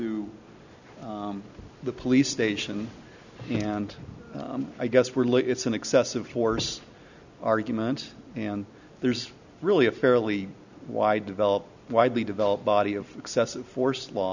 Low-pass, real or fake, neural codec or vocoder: 7.2 kHz; real; none